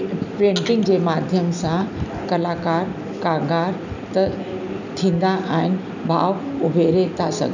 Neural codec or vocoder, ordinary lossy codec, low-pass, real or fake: none; none; 7.2 kHz; real